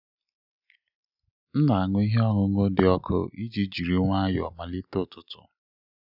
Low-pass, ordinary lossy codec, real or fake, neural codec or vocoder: 5.4 kHz; none; real; none